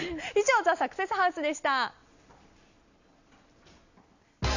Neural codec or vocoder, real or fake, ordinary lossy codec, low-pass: none; real; none; 7.2 kHz